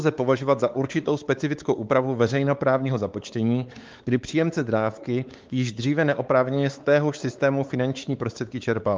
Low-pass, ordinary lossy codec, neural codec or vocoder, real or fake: 7.2 kHz; Opus, 32 kbps; codec, 16 kHz, 4 kbps, X-Codec, WavLM features, trained on Multilingual LibriSpeech; fake